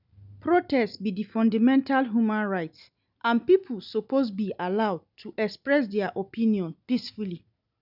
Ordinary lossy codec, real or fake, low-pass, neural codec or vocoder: none; real; 5.4 kHz; none